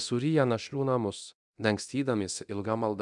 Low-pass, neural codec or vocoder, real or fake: 10.8 kHz; codec, 24 kHz, 0.9 kbps, DualCodec; fake